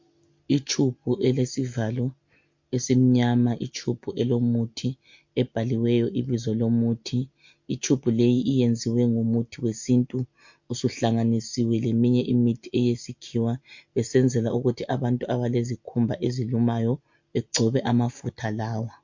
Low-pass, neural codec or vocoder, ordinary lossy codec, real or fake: 7.2 kHz; none; MP3, 48 kbps; real